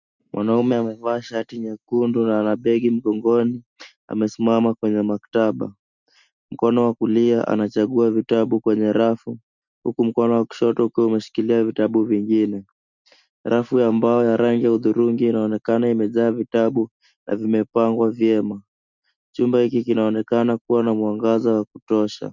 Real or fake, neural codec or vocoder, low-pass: real; none; 7.2 kHz